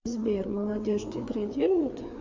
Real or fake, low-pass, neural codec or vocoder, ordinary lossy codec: fake; 7.2 kHz; codec, 16 kHz, 4 kbps, FreqCodec, larger model; MP3, 48 kbps